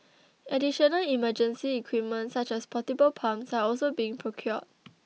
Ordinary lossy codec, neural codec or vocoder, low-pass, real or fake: none; none; none; real